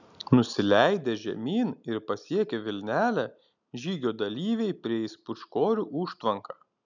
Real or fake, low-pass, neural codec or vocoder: real; 7.2 kHz; none